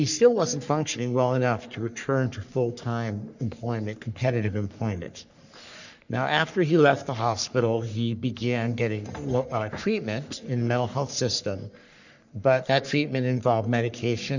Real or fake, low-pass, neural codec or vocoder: fake; 7.2 kHz; codec, 44.1 kHz, 3.4 kbps, Pupu-Codec